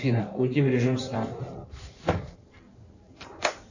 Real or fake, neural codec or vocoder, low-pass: fake; codec, 16 kHz in and 24 kHz out, 1.1 kbps, FireRedTTS-2 codec; 7.2 kHz